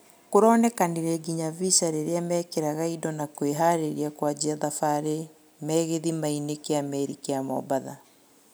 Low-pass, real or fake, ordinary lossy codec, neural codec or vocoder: none; real; none; none